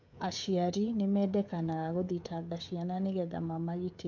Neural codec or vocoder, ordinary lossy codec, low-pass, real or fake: codec, 16 kHz, 16 kbps, FreqCodec, smaller model; none; 7.2 kHz; fake